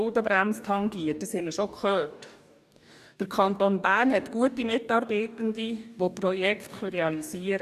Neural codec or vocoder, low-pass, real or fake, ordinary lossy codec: codec, 44.1 kHz, 2.6 kbps, DAC; 14.4 kHz; fake; none